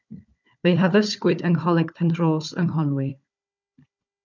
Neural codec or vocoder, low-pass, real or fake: codec, 16 kHz, 4 kbps, FunCodec, trained on Chinese and English, 50 frames a second; 7.2 kHz; fake